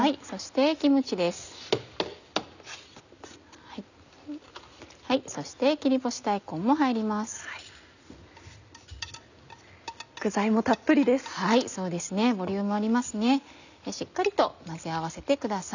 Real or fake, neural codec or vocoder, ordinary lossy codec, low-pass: real; none; none; 7.2 kHz